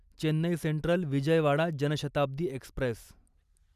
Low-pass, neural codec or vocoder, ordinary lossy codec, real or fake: 14.4 kHz; none; none; real